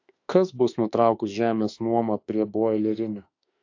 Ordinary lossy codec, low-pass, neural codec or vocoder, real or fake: AAC, 48 kbps; 7.2 kHz; autoencoder, 48 kHz, 32 numbers a frame, DAC-VAE, trained on Japanese speech; fake